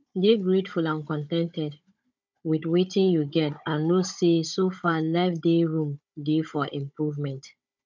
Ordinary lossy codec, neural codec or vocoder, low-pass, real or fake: MP3, 64 kbps; codec, 16 kHz, 16 kbps, FunCodec, trained on Chinese and English, 50 frames a second; 7.2 kHz; fake